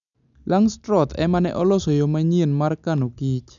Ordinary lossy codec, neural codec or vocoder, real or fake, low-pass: none; none; real; 7.2 kHz